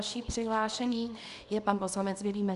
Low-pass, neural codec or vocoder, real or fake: 10.8 kHz; codec, 24 kHz, 0.9 kbps, WavTokenizer, small release; fake